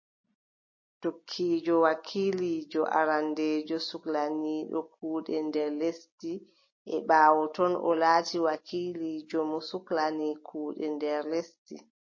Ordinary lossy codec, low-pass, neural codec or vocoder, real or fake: MP3, 32 kbps; 7.2 kHz; none; real